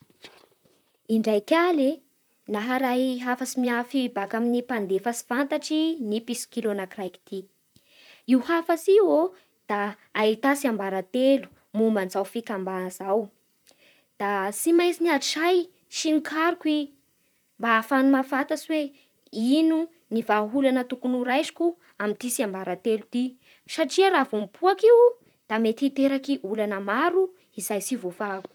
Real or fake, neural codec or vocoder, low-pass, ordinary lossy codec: fake; vocoder, 44.1 kHz, 128 mel bands, Pupu-Vocoder; none; none